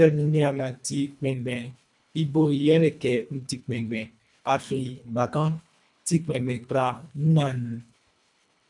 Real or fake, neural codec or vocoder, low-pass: fake; codec, 24 kHz, 1.5 kbps, HILCodec; 10.8 kHz